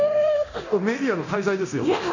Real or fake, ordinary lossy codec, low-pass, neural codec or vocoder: fake; none; 7.2 kHz; codec, 24 kHz, 0.9 kbps, DualCodec